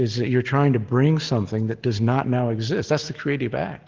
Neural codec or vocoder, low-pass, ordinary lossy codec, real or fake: none; 7.2 kHz; Opus, 16 kbps; real